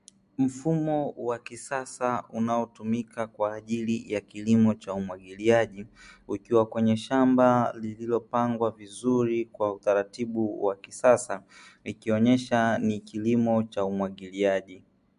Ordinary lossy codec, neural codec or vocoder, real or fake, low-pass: MP3, 64 kbps; none; real; 10.8 kHz